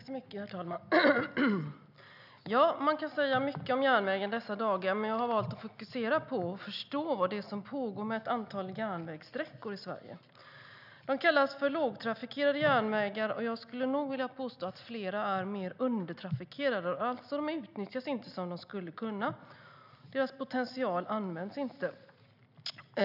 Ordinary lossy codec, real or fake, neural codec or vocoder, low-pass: none; real; none; 5.4 kHz